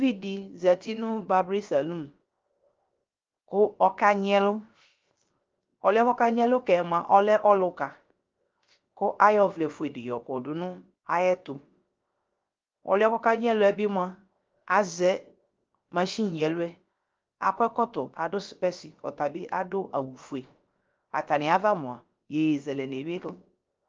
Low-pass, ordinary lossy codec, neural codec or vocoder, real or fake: 7.2 kHz; Opus, 24 kbps; codec, 16 kHz, 0.7 kbps, FocalCodec; fake